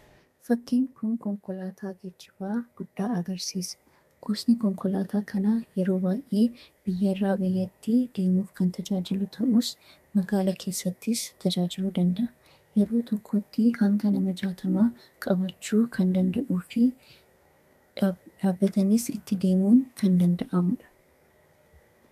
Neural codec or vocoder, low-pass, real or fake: codec, 32 kHz, 1.9 kbps, SNAC; 14.4 kHz; fake